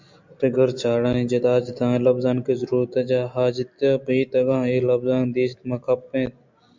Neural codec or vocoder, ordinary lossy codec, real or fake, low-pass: none; MP3, 64 kbps; real; 7.2 kHz